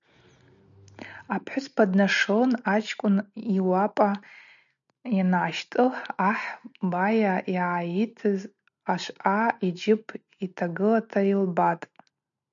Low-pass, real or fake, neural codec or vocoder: 7.2 kHz; real; none